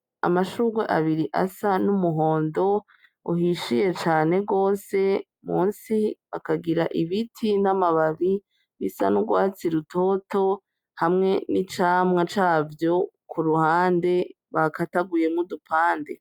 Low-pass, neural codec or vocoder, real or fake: 19.8 kHz; none; real